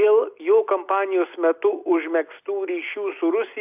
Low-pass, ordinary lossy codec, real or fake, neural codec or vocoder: 3.6 kHz; AAC, 32 kbps; real; none